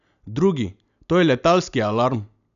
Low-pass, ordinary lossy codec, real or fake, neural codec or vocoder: 7.2 kHz; none; real; none